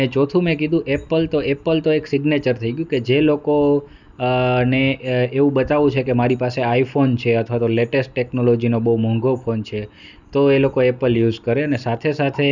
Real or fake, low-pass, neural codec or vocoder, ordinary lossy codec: real; 7.2 kHz; none; none